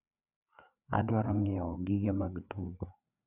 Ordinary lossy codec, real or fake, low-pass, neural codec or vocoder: none; fake; 3.6 kHz; codec, 16 kHz, 4 kbps, FreqCodec, larger model